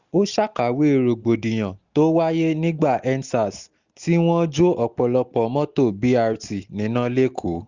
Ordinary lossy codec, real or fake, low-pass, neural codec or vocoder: Opus, 64 kbps; real; 7.2 kHz; none